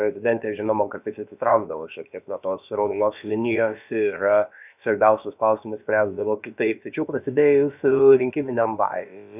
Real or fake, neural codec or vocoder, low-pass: fake; codec, 16 kHz, about 1 kbps, DyCAST, with the encoder's durations; 3.6 kHz